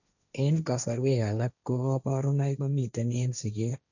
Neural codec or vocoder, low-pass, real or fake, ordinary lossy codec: codec, 16 kHz, 1.1 kbps, Voila-Tokenizer; 7.2 kHz; fake; none